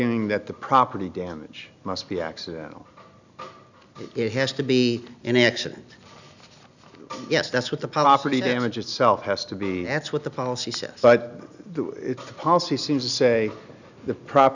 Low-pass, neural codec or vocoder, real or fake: 7.2 kHz; none; real